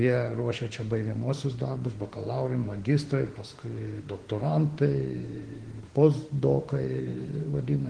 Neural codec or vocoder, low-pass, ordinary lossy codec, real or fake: autoencoder, 48 kHz, 32 numbers a frame, DAC-VAE, trained on Japanese speech; 9.9 kHz; Opus, 16 kbps; fake